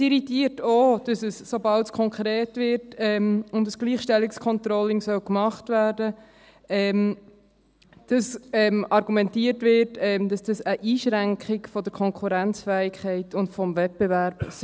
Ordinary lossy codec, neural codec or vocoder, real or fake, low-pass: none; none; real; none